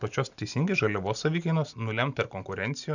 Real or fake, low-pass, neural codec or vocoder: real; 7.2 kHz; none